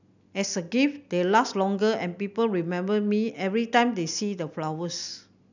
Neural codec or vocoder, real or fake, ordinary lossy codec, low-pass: none; real; none; 7.2 kHz